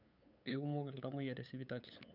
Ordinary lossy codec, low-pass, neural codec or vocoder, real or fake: none; 5.4 kHz; codec, 16 kHz, 8 kbps, FunCodec, trained on LibriTTS, 25 frames a second; fake